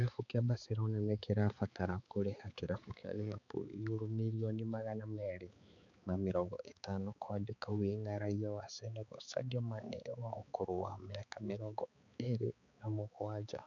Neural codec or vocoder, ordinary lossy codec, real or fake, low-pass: codec, 16 kHz, 4 kbps, X-Codec, HuBERT features, trained on balanced general audio; none; fake; 7.2 kHz